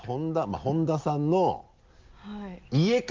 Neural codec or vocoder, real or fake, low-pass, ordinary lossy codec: none; real; 7.2 kHz; Opus, 32 kbps